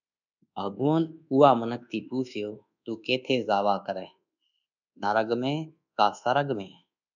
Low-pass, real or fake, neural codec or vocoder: 7.2 kHz; fake; codec, 24 kHz, 1.2 kbps, DualCodec